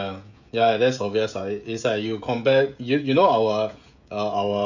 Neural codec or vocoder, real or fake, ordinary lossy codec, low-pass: codec, 16 kHz, 16 kbps, FreqCodec, smaller model; fake; none; 7.2 kHz